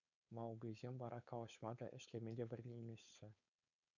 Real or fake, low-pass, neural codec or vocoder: fake; 7.2 kHz; codec, 16 kHz, 4.8 kbps, FACodec